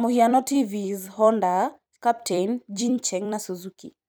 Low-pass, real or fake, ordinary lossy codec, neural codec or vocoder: none; fake; none; vocoder, 44.1 kHz, 128 mel bands every 256 samples, BigVGAN v2